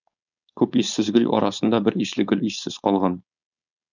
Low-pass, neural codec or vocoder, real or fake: 7.2 kHz; codec, 16 kHz, 4.8 kbps, FACodec; fake